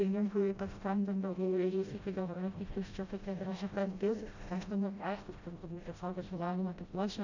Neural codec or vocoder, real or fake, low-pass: codec, 16 kHz, 0.5 kbps, FreqCodec, smaller model; fake; 7.2 kHz